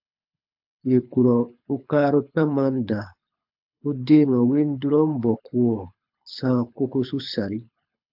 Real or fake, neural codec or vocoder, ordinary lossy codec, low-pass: fake; codec, 24 kHz, 6 kbps, HILCodec; AAC, 48 kbps; 5.4 kHz